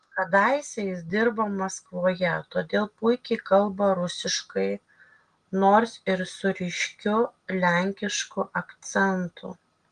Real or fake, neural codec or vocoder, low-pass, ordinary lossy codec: real; none; 9.9 kHz; Opus, 24 kbps